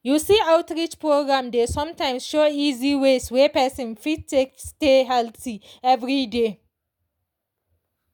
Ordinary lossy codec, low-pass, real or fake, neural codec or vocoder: none; 19.8 kHz; real; none